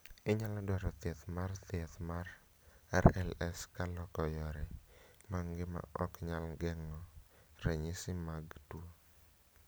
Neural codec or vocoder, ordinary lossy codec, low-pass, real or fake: none; none; none; real